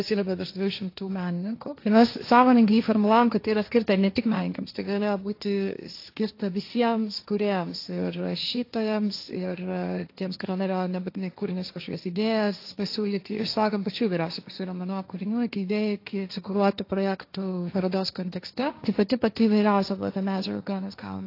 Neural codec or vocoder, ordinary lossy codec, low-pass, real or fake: codec, 16 kHz, 1.1 kbps, Voila-Tokenizer; AAC, 32 kbps; 5.4 kHz; fake